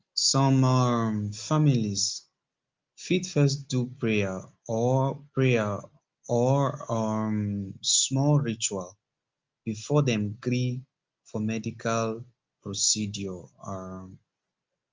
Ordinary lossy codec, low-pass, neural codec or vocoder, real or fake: Opus, 32 kbps; 7.2 kHz; none; real